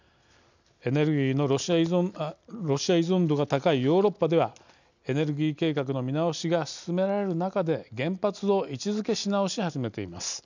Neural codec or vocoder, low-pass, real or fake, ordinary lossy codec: none; 7.2 kHz; real; none